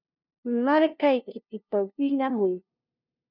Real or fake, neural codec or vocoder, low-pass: fake; codec, 16 kHz, 0.5 kbps, FunCodec, trained on LibriTTS, 25 frames a second; 5.4 kHz